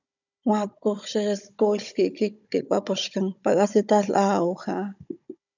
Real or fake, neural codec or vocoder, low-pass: fake; codec, 16 kHz, 16 kbps, FunCodec, trained on Chinese and English, 50 frames a second; 7.2 kHz